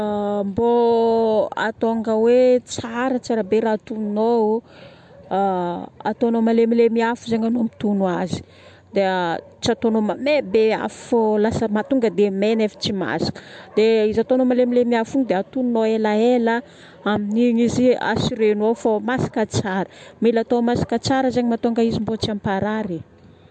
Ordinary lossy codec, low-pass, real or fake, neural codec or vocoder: MP3, 64 kbps; 9.9 kHz; real; none